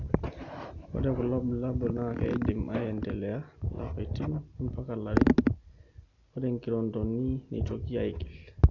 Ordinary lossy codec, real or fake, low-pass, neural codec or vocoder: none; real; 7.2 kHz; none